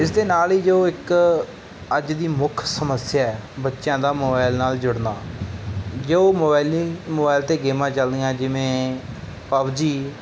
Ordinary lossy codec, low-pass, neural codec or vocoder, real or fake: none; none; none; real